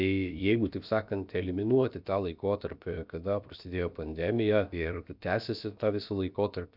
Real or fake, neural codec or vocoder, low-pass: fake; codec, 16 kHz, about 1 kbps, DyCAST, with the encoder's durations; 5.4 kHz